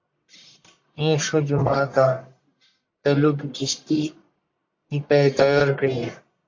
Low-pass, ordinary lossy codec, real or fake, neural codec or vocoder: 7.2 kHz; AAC, 48 kbps; fake; codec, 44.1 kHz, 1.7 kbps, Pupu-Codec